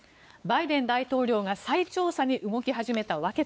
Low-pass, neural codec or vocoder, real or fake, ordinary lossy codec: none; codec, 16 kHz, 4 kbps, X-Codec, WavLM features, trained on Multilingual LibriSpeech; fake; none